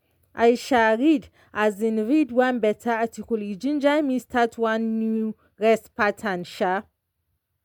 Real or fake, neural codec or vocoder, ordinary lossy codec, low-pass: real; none; MP3, 96 kbps; 19.8 kHz